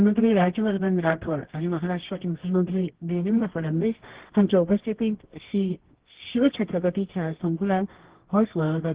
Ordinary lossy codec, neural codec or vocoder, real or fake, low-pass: Opus, 16 kbps; codec, 24 kHz, 0.9 kbps, WavTokenizer, medium music audio release; fake; 3.6 kHz